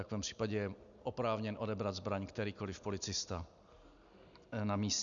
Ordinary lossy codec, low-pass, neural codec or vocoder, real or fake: MP3, 96 kbps; 7.2 kHz; none; real